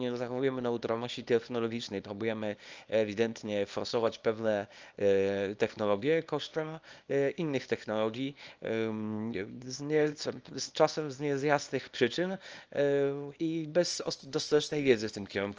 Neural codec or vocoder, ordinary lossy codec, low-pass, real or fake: codec, 24 kHz, 0.9 kbps, WavTokenizer, small release; Opus, 24 kbps; 7.2 kHz; fake